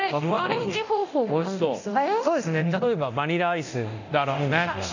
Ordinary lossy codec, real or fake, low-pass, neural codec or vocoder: none; fake; 7.2 kHz; codec, 24 kHz, 0.9 kbps, DualCodec